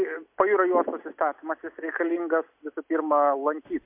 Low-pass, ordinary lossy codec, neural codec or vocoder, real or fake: 3.6 kHz; MP3, 32 kbps; none; real